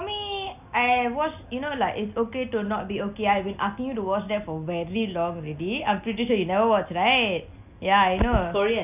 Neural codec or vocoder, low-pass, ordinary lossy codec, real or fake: none; 3.6 kHz; none; real